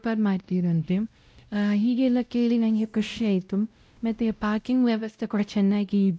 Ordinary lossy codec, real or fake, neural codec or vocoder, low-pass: none; fake; codec, 16 kHz, 0.5 kbps, X-Codec, WavLM features, trained on Multilingual LibriSpeech; none